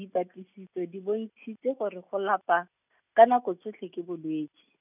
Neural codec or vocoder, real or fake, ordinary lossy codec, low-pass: none; real; none; 3.6 kHz